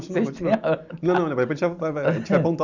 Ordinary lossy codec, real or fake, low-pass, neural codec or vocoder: none; real; 7.2 kHz; none